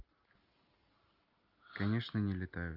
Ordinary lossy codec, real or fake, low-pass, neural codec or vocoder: Opus, 24 kbps; real; 5.4 kHz; none